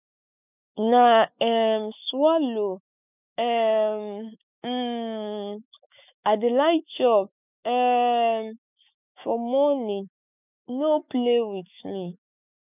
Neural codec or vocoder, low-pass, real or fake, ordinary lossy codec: autoencoder, 48 kHz, 128 numbers a frame, DAC-VAE, trained on Japanese speech; 3.6 kHz; fake; none